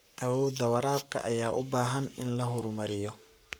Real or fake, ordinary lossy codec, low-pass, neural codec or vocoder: fake; none; none; codec, 44.1 kHz, 7.8 kbps, Pupu-Codec